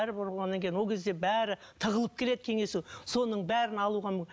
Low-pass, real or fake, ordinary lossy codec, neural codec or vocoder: none; real; none; none